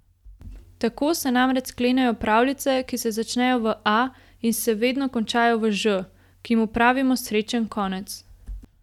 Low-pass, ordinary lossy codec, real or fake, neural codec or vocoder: 19.8 kHz; none; real; none